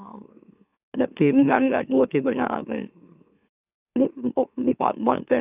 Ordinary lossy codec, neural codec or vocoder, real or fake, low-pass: none; autoencoder, 44.1 kHz, a latent of 192 numbers a frame, MeloTTS; fake; 3.6 kHz